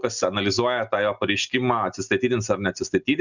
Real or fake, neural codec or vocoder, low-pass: real; none; 7.2 kHz